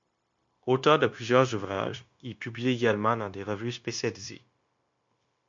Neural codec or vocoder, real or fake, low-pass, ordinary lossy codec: codec, 16 kHz, 0.9 kbps, LongCat-Audio-Codec; fake; 7.2 kHz; MP3, 48 kbps